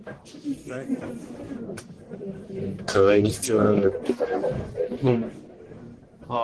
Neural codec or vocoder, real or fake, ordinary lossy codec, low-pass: codec, 44.1 kHz, 1.7 kbps, Pupu-Codec; fake; Opus, 16 kbps; 10.8 kHz